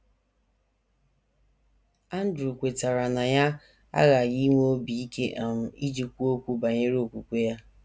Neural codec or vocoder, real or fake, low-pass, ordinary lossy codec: none; real; none; none